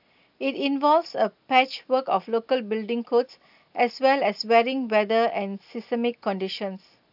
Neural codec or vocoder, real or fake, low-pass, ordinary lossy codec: none; real; 5.4 kHz; AAC, 48 kbps